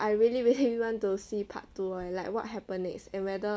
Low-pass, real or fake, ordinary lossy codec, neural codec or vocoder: none; real; none; none